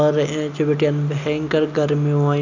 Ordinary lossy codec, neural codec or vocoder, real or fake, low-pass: none; none; real; 7.2 kHz